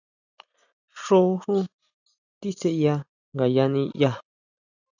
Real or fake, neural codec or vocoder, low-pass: real; none; 7.2 kHz